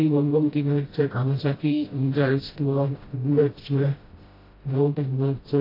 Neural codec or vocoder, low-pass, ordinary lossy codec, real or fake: codec, 16 kHz, 0.5 kbps, FreqCodec, smaller model; 5.4 kHz; AAC, 24 kbps; fake